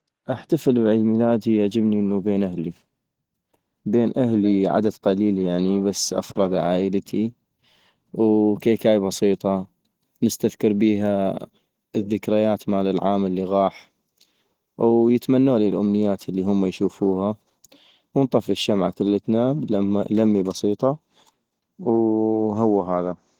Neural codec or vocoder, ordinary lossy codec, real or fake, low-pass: none; Opus, 16 kbps; real; 19.8 kHz